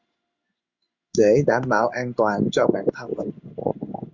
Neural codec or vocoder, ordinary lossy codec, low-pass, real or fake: codec, 16 kHz in and 24 kHz out, 1 kbps, XY-Tokenizer; Opus, 64 kbps; 7.2 kHz; fake